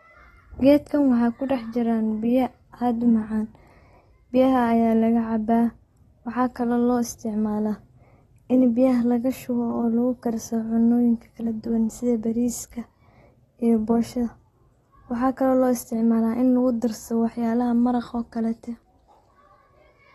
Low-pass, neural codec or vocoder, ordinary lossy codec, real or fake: 19.8 kHz; none; AAC, 32 kbps; real